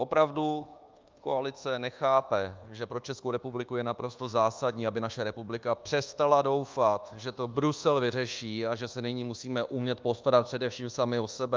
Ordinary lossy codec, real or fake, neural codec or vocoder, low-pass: Opus, 32 kbps; fake; codec, 24 kHz, 1.2 kbps, DualCodec; 7.2 kHz